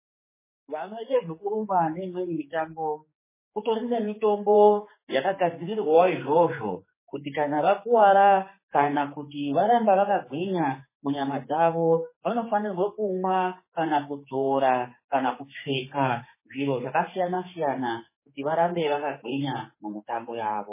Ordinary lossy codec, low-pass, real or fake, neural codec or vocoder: MP3, 16 kbps; 3.6 kHz; fake; codec, 16 kHz, 4 kbps, X-Codec, HuBERT features, trained on general audio